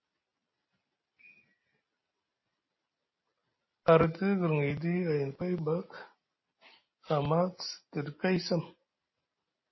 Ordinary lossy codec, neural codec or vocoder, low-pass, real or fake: MP3, 24 kbps; none; 7.2 kHz; real